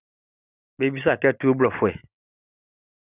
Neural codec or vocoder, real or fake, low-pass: none; real; 3.6 kHz